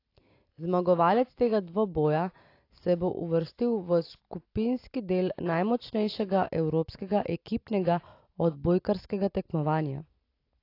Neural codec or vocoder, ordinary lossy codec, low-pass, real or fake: none; AAC, 32 kbps; 5.4 kHz; real